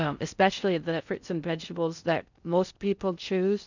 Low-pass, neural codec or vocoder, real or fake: 7.2 kHz; codec, 16 kHz in and 24 kHz out, 0.6 kbps, FocalCodec, streaming, 4096 codes; fake